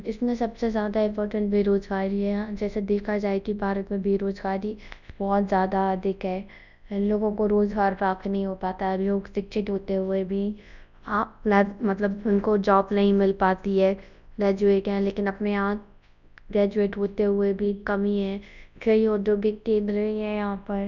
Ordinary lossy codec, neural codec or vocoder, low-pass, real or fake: none; codec, 24 kHz, 0.9 kbps, WavTokenizer, large speech release; 7.2 kHz; fake